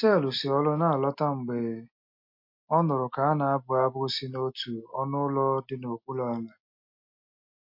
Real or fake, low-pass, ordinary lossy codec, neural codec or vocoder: real; 5.4 kHz; MP3, 32 kbps; none